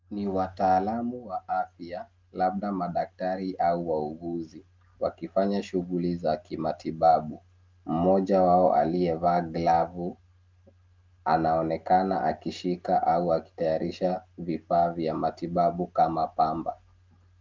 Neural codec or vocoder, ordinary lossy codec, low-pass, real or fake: none; Opus, 24 kbps; 7.2 kHz; real